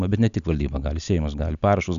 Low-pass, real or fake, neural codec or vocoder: 7.2 kHz; real; none